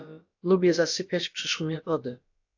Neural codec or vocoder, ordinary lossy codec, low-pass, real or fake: codec, 16 kHz, about 1 kbps, DyCAST, with the encoder's durations; AAC, 48 kbps; 7.2 kHz; fake